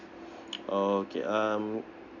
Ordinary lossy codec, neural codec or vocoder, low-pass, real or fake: none; none; 7.2 kHz; real